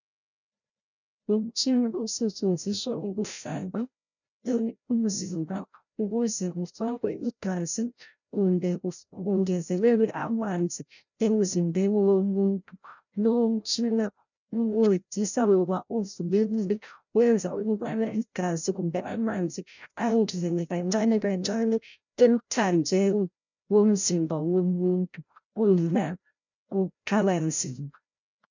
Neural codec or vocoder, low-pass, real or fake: codec, 16 kHz, 0.5 kbps, FreqCodec, larger model; 7.2 kHz; fake